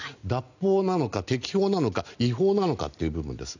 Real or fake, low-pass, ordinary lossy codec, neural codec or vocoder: real; 7.2 kHz; none; none